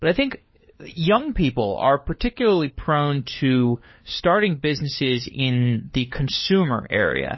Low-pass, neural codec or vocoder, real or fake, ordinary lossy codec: 7.2 kHz; codec, 16 kHz, 4 kbps, FunCodec, trained on LibriTTS, 50 frames a second; fake; MP3, 24 kbps